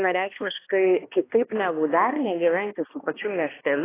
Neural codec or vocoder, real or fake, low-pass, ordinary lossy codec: codec, 16 kHz, 1 kbps, X-Codec, HuBERT features, trained on balanced general audio; fake; 3.6 kHz; AAC, 16 kbps